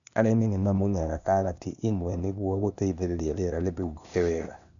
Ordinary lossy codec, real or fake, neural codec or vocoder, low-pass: none; fake; codec, 16 kHz, 0.8 kbps, ZipCodec; 7.2 kHz